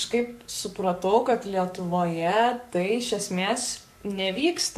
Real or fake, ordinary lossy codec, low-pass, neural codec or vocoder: fake; MP3, 64 kbps; 14.4 kHz; codec, 44.1 kHz, 7.8 kbps, DAC